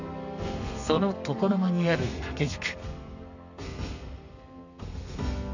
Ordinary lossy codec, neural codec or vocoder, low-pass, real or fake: none; codec, 32 kHz, 1.9 kbps, SNAC; 7.2 kHz; fake